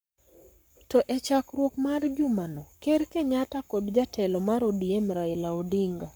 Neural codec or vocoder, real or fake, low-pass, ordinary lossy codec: codec, 44.1 kHz, 7.8 kbps, Pupu-Codec; fake; none; none